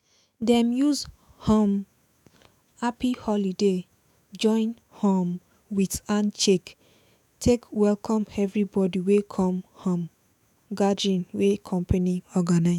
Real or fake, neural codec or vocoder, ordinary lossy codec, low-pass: fake; autoencoder, 48 kHz, 128 numbers a frame, DAC-VAE, trained on Japanese speech; none; 19.8 kHz